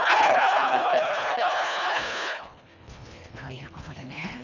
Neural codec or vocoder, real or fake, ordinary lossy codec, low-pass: codec, 24 kHz, 1.5 kbps, HILCodec; fake; none; 7.2 kHz